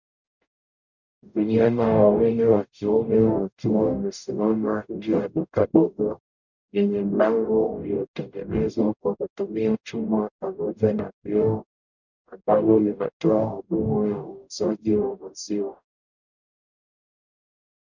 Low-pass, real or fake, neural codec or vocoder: 7.2 kHz; fake; codec, 44.1 kHz, 0.9 kbps, DAC